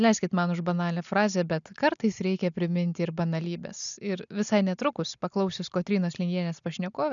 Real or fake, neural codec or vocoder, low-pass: real; none; 7.2 kHz